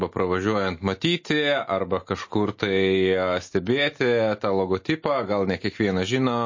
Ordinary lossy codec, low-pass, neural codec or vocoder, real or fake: MP3, 32 kbps; 7.2 kHz; none; real